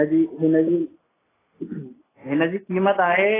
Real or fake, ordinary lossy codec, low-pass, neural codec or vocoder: real; AAC, 16 kbps; 3.6 kHz; none